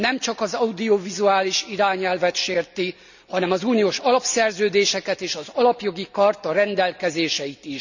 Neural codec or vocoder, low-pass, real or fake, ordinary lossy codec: none; 7.2 kHz; real; none